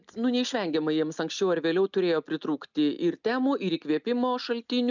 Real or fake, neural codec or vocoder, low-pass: real; none; 7.2 kHz